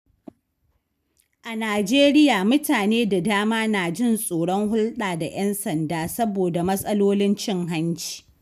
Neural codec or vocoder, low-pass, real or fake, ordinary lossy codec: none; 14.4 kHz; real; none